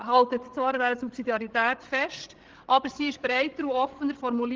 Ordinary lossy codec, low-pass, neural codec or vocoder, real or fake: Opus, 16 kbps; 7.2 kHz; codec, 16 kHz, 16 kbps, FreqCodec, larger model; fake